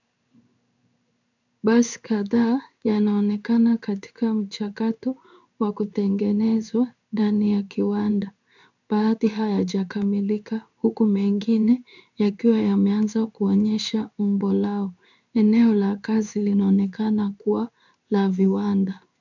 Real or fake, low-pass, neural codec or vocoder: fake; 7.2 kHz; codec, 16 kHz in and 24 kHz out, 1 kbps, XY-Tokenizer